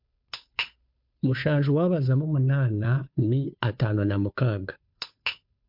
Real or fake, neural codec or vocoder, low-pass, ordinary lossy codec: fake; codec, 16 kHz, 2 kbps, FunCodec, trained on Chinese and English, 25 frames a second; 5.4 kHz; MP3, 48 kbps